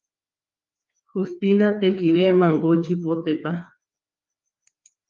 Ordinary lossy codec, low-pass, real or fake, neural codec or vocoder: Opus, 32 kbps; 7.2 kHz; fake; codec, 16 kHz, 2 kbps, FreqCodec, larger model